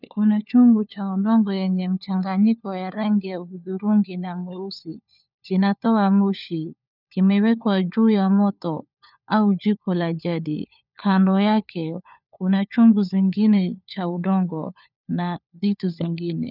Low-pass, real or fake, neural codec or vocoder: 5.4 kHz; fake; codec, 16 kHz, 4 kbps, FunCodec, trained on LibriTTS, 50 frames a second